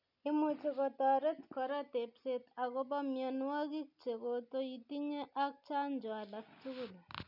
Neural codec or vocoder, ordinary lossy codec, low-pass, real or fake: none; none; 5.4 kHz; real